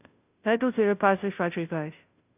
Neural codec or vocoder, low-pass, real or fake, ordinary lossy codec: codec, 16 kHz, 0.5 kbps, FunCodec, trained on Chinese and English, 25 frames a second; 3.6 kHz; fake; none